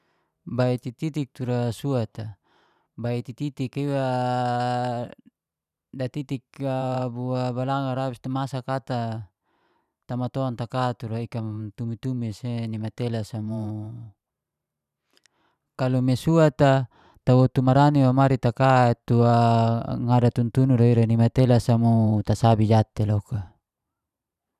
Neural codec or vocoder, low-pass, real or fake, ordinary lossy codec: vocoder, 44.1 kHz, 128 mel bands every 512 samples, BigVGAN v2; 14.4 kHz; fake; none